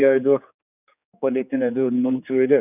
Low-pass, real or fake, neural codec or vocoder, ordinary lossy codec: 3.6 kHz; fake; codec, 16 kHz, 4 kbps, X-Codec, HuBERT features, trained on general audio; none